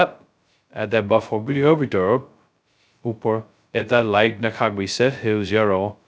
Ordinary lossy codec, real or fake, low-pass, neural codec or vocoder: none; fake; none; codec, 16 kHz, 0.2 kbps, FocalCodec